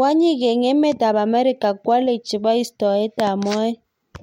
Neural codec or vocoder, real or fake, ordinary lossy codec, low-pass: none; real; MP3, 64 kbps; 19.8 kHz